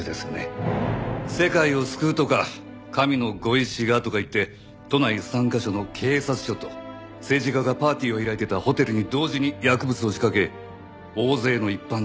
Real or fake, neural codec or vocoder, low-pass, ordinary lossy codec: real; none; none; none